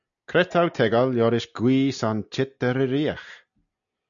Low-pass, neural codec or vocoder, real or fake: 7.2 kHz; none; real